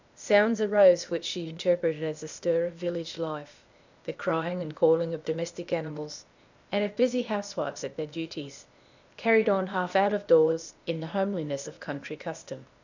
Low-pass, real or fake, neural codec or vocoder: 7.2 kHz; fake; codec, 16 kHz, 0.8 kbps, ZipCodec